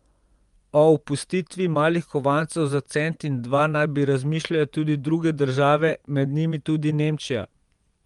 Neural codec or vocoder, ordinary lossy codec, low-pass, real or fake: vocoder, 24 kHz, 100 mel bands, Vocos; Opus, 32 kbps; 10.8 kHz; fake